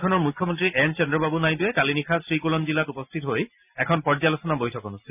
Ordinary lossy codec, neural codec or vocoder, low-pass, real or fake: none; none; 3.6 kHz; real